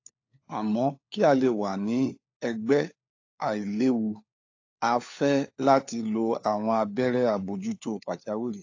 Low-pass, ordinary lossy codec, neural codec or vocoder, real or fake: 7.2 kHz; AAC, 48 kbps; codec, 16 kHz, 4 kbps, FunCodec, trained on LibriTTS, 50 frames a second; fake